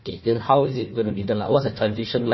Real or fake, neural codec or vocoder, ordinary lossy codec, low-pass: fake; codec, 16 kHz, 1 kbps, FunCodec, trained on Chinese and English, 50 frames a second; MP3, 24 kbps; 7.2 kHz